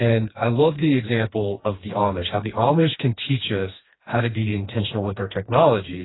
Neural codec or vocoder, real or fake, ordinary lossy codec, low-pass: codec, 16 kHz, 2 kbps, FreqCodec, smaller model; fake; AAC, 16 kbps; 7.2 kHz